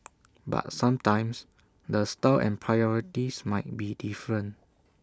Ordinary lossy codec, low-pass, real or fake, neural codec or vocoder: none; none; real; none